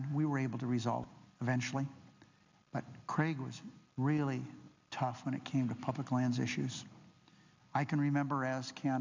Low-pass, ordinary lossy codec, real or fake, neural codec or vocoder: 7.2 kHz; MP3, 64 kbps; real; none